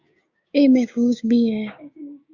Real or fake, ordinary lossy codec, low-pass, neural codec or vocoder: fake; Opus, 64 kbps; 7.2 kHz; codec, 16 kHz, 6 kbps, DAC